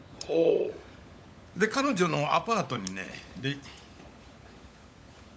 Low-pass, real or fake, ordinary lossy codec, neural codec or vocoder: none; fake; none; codec, 16 kHz, 16 kbps, FunCodec, trained on LibriTTS, 50 frames a second